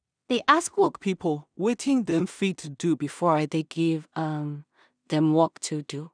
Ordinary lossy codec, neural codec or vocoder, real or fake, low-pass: none; codec, 16 kHz in and 24 kHz out, 0.4 kbps, LongCat-Audio-Codec, two codebook decoder; fake; 9.9 kHz